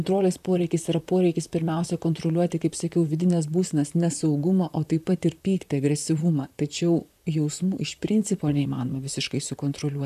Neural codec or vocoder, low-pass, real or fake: vocoder, 44.1 kHz, 128 mel bands, Pupu-Vocoder; 14.4 kHz; fake